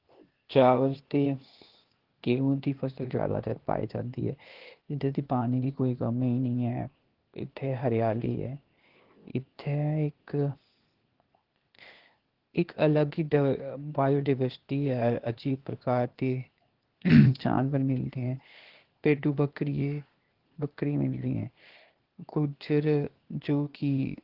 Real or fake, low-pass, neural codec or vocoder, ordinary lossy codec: fake; 5.4 kHz; codec, 16 kHz, 0.8 kbps, ZipCodec; Opus, 16 kbps